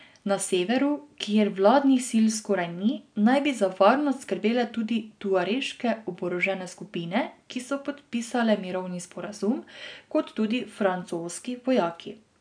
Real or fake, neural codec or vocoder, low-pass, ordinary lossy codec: real; none; 9.9 kHz; none